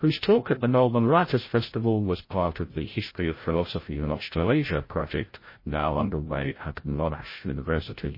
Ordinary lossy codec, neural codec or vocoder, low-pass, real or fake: MP3, 24 kbps; codec, 16 kHz, 0.5 kbps, FreqCodec, larger model; 5.4 kHz; fake